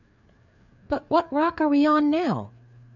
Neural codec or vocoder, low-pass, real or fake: codec, 16 kHz, 4 kbps, FreqCodec, larger model; 7.2 kHz; fake